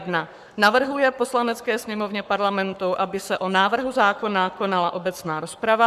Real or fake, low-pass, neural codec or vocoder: fake; 14.4 kHz; codec, 44.1 kHz, 7.8 kbps, Pupu-Codec